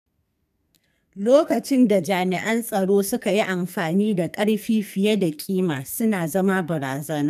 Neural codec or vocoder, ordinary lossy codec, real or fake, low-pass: codec, 44.1 kHz, 2.6 kbps, SNAC; none; fake; 14.4 kHz